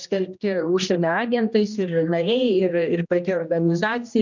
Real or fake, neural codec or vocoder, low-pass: fake; codec, 16 kHz, 1 kbps, X-Codec, HuBERT features, trained on general audio; 7.2 kHz